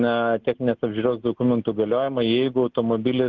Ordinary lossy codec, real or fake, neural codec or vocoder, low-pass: Opus, 16 kbps; real; none; 7.2 kHz